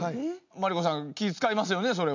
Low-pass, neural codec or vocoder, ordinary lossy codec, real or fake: 7.2 kHz; none; none; real